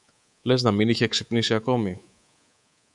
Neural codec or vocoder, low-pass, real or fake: codec, 24 kHz, 3.1 kbps, DualCodec; 10.8 kHz; fake